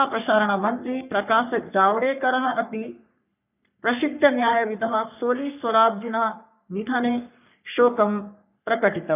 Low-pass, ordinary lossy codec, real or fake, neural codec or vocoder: 3.6 kHz; none; fake; codec, 44.1 kHz, 3.4 kbps, Pupu-Codec